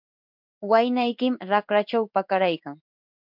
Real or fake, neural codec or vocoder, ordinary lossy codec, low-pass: fake; codec, 16 kHz in and 24 kHz out, 1 kbps, XY-Tokenizer; MP3, 48 kbps; 5.4 kHz